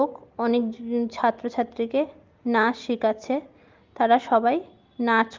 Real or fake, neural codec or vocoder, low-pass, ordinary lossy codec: real; none; 7.2 kHz; Opus, 32 kbps